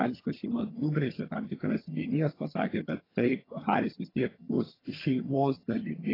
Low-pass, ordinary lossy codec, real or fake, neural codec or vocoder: 5.4 kHz; AAC, 24 kbps; fake; vocoder, 22.05 kHz, 80 mel bands, HiFi-GAN